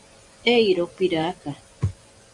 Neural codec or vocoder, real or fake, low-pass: vocoder, 24 kHz, 100 mel bands, Vocos; fake; 10.8 kHz